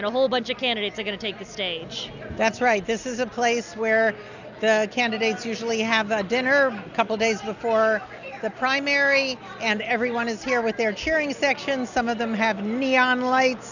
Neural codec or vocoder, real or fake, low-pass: none; real; 7.2 kHz